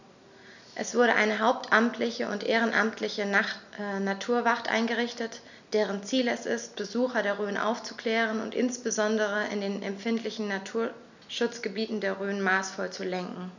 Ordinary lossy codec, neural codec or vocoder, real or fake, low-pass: none; none; real; 7.2 kHz